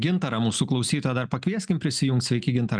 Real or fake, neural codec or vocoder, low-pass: real; none; 9.9 kHz